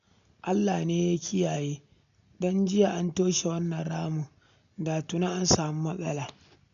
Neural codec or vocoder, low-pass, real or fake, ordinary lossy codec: none; 7.2 kHz; real; none